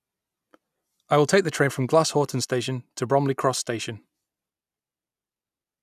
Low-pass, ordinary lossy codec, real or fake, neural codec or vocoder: 14.4 kHz; none; real; none